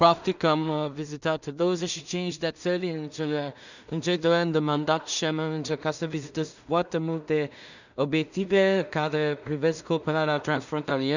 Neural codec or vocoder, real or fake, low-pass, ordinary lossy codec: codec, 16 kHz in and 24 kHz out, 0.4 kbps, LongCat-Audio-Codec, two codebook decoder; fake; 7.2 kHz; none